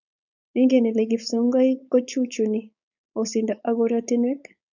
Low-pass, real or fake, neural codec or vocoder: 7.2 kHz; fake; codec, 16 kHz, 4.8 kbps, FACodec